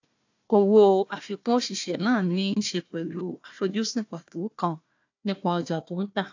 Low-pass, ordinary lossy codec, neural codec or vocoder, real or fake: 7.2 kHz; AAC, 48 kbps; codec, 16 kHz, 1 kbps, FunCodec, trained on Chinese and English, 50 frames a second; fake